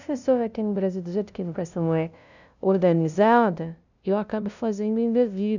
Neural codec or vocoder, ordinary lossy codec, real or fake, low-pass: codec, 16 kHz, 0.5 kbps, FunCodec, trained on LibriTTS, 25 frames a second; none; fake; 7.2 kHz